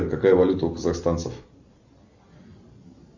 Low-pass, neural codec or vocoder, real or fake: 7.2 kHz; none; real